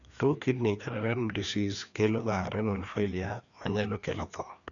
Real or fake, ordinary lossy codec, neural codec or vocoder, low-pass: fake; none; codec, 16 kHz, 2 kbps, FreqCodec, larger model; 7.2 kHz